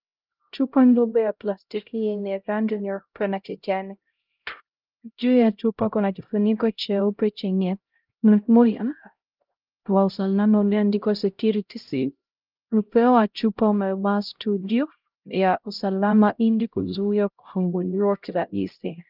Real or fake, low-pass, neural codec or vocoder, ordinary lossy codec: fake; 5.4 kHz; codec, 16 kHz, 0.5 kbps, X-Codec, HuBERT features, trained on LibriSpeech; Opus, 24 kbps